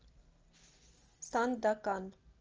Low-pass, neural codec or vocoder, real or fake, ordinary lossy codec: 7.2 kHz; none; real; Opus, 24 kbps